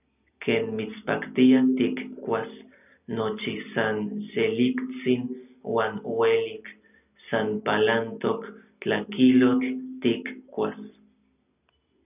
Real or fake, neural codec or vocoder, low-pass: real; none; 3.6 kHz